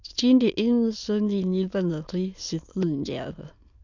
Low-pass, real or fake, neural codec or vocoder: 7.2 kHz; fake; autoencoder, 22.05 kHz, a latent of 192 numbers a frame, VITS, trained on many speakers